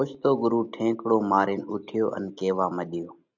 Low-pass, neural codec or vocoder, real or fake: 7.2 kHz; none; real